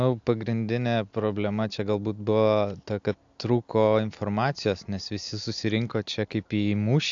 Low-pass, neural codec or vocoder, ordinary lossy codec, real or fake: 7.2 kHz; none; AAC, 64 kbps; real